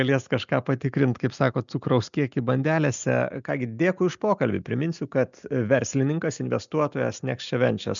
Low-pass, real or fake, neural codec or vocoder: 7.2 kHz; real; none